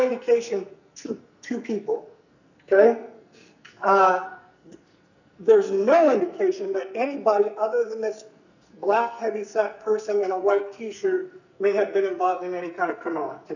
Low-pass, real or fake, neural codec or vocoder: 7.2 kHz; fake; codec, 44.1 kHz, 2.6 kbps, SNAC